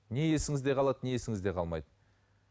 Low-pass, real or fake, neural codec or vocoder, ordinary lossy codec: none; real; none; none